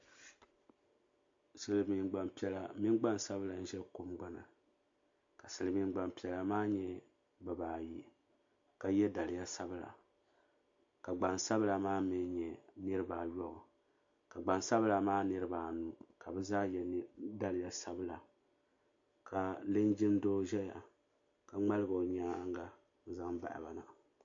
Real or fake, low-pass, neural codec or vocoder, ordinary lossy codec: real; 7.2 kHz; none; MP3, 48 kbps